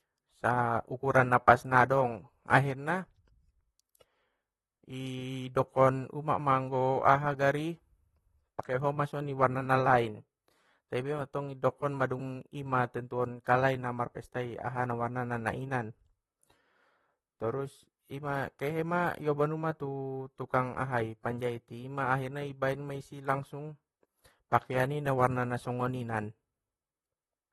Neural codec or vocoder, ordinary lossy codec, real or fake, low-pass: vocoder, 44.1 kHz, 128 mel bands, Pupu-Vocoder; AAC, 32 kbps; fake; 19.8 kHz